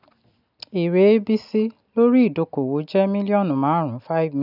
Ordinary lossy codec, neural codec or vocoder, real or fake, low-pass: none; none; real; 5.4 kHz